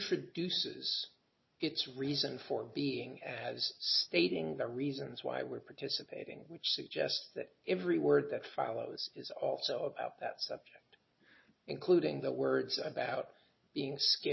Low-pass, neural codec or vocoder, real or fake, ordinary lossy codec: 7.2 kHz; none; real; MP3, 24 kbps